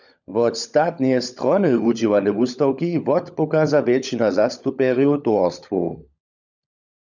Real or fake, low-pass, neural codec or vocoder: fake; 7.2 kHz; codec, 16 kHz, 16 kbps, FunCodec, trained on LibriTTS, 50 frames a second